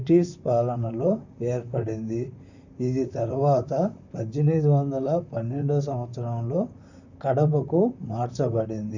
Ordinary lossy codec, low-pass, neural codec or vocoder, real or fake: none; 7.2 kHz; vocoder, 44.1 kHz, 128 mel bands, Pupu-Vocoder; fake